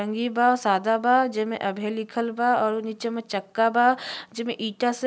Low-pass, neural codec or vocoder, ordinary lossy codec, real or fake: none; none; none; real